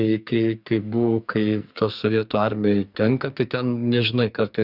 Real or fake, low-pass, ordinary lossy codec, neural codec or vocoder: fake; 5.4 kHz; Opus, 64 kbps; codec, 44.1 kHz, 2.6 kbps, SNAC